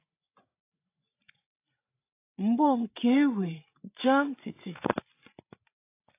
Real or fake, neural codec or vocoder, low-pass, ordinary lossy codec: fake; codec, 16 kHz, 8 kbps, FreqCodec, larger model; 3.6 kHz; AAC, 24 kbps